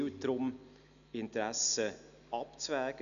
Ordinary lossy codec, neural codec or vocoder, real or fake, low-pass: none; none; real; 7.2 kHz